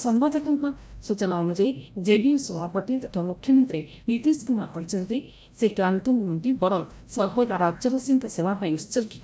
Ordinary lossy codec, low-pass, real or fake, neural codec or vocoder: none; none; fake; codec, 16 kHz, 0.5 kbps, FreqCodec, larger model